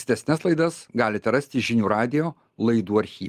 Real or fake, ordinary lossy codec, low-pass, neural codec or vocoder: real; Opus, 32 kbps; 14.4 kHz; none